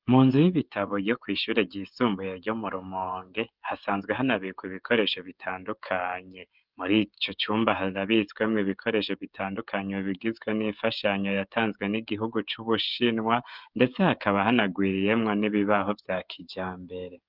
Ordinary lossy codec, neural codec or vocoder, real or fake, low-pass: Opus, 24 kbps; codec, 16 kHz, 16 kbps, FreqCodec, smaller model; fake; 5.4 kHz